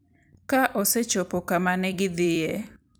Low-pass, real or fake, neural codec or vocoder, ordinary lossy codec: none; fake; vocoder, 44.1 kHz, 128 mel bands every 256 samples, BigVGAN v2; none